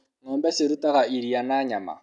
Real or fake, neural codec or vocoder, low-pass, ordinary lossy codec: real; none; 10.8 kHz; none